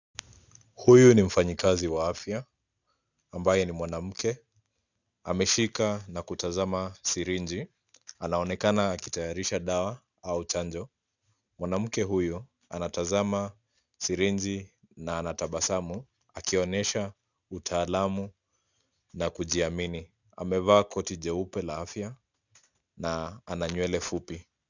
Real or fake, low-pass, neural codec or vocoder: real; 7.2 kHz; none